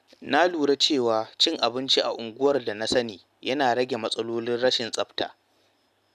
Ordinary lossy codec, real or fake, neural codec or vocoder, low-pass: none; real; none; 14.4 kHz